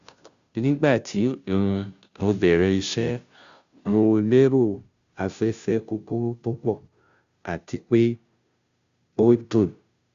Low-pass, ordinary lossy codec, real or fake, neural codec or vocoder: 7.2 kHz; Opus, 64 kbps; fake; codec, 16 kHz, 0.5 kbps, FunCodec, trained on Chinese and English, 25 frames a second